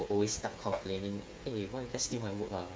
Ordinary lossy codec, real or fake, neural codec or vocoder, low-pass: none; fake; codec, 16 kHz, 8 kbps, FreqCodec, smaller model; none